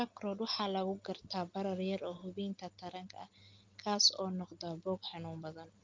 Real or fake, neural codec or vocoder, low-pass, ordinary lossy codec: real; none; 7.2 kHz; Opus, 32 kbps